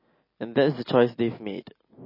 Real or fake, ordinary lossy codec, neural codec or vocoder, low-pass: real; MP3, 24 kbps; none; 5.4 kHz